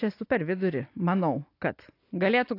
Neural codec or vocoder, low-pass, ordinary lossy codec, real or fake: none; 5.4 kHz; AAC, 32 kbps; real